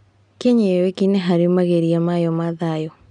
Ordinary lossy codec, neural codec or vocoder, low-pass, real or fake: none; none; 9.9 kHz; real